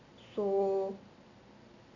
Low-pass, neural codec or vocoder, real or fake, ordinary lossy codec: 7.2 kHz; none; real; none